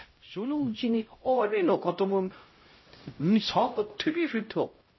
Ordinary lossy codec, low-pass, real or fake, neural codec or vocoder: MP3, 24 kbps; 7.2 kHz; fake; codec, 16 kHz, 0.5 kbps, X-Codec, HuBERT features, trained on LibriSpeech